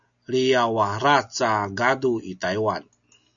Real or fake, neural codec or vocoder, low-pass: real; none; 7.2 kHz